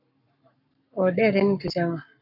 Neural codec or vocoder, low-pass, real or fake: codec, 44.1 kHz, 7.8 kbps, Pupu-Codec; 5.4 kHz; fake